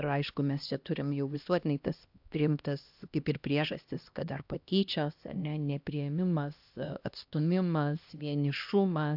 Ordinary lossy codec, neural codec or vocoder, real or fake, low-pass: AAC, 48 kbps; codec, 16 kHz, 1 kbps, X-Codec, HuBERT features, trained on LibriSpeech; fake; 5.4 kHz